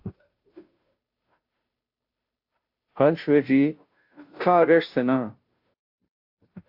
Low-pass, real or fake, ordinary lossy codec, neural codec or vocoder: 5.4 kHz; fake; AAC, 48 kbps; codec, 16 kHz, 0.5 kbps, FunCodec, trained on Chinese and English, 25 frames a second